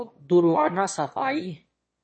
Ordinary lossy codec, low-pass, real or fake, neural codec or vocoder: MP3, 32 kbps; 9.9 kHz; fake; autoencoder, 22.05 kHz, a latent of 192 numbers a frame, VITS, trained on one speaker